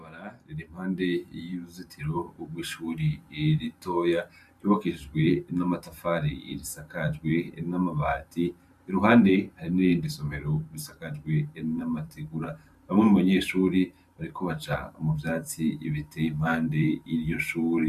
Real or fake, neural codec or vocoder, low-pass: fake; vocoder, 44.1 kHz, 128 mel bands every 512 samples, BigVGAN v2; 14.4 kHz